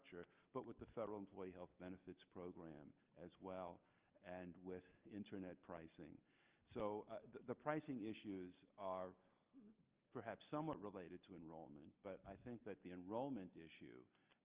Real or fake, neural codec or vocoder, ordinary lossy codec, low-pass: real; none; Opus, 32 kbps; 3.6 kHz